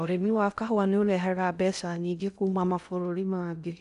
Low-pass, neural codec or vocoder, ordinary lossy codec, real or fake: 10.8 kHz; codec, 16 kHz in and 24 kHz out, 0.6 kbps, FocalCodec, streaming, 4096 codes; none; fake